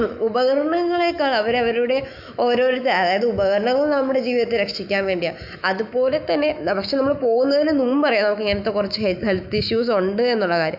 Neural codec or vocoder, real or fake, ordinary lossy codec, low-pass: autoencoder, 48 kHz, 128 numbers a frame, DAC-VAE, trained on Japanese speech; fake; AAC, 48 kbps; 5.4 kHz